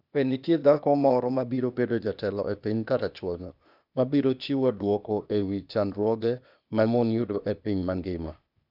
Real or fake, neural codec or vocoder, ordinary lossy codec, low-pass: fake; codec, 16 kHz, 0.8 kbps, ZipCodec; none; 5.4 kHz